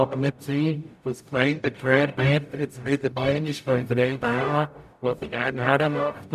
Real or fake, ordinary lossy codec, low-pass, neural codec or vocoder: fake; none; 14.4 kHz; codec, 44.1 kHz, 0.9 kbps, DAC